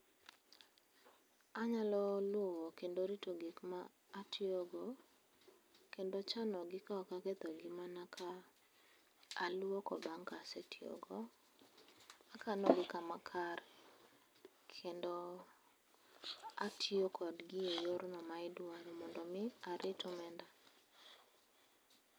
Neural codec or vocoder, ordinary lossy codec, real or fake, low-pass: none; none; real; none